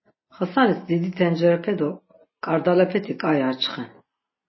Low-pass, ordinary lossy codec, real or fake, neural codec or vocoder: 7.2 kHz; MP3, 24 kbps; real; none